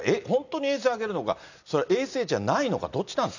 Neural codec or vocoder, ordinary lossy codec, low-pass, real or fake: none; none; 7.2 kHz; real